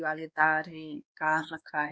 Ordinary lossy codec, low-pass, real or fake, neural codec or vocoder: none; none; fake; codec, 16 kHz, 2 kbps, X-Codec, WavLM features, trained on Multilingual LibriSpeech